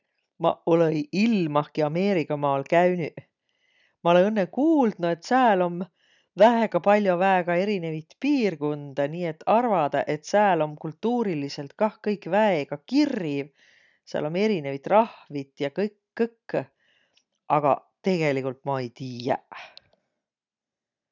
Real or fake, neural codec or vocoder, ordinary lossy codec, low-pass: real; none; none; 7.2 kHz